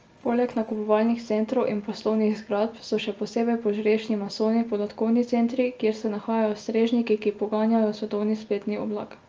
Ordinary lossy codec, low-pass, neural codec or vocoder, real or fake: Opus, 24 kbps; 7.2 kHz; none; real